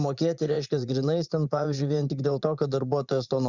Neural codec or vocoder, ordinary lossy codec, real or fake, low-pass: vocoder, 44.1 kHz, 80 mel bands, Vocos; Opus, 64 kbps; fake; 7.2 kHz